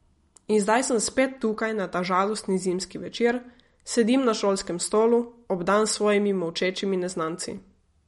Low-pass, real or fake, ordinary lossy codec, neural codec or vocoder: 19.8 kHz; real; MP3, 48 kbps; none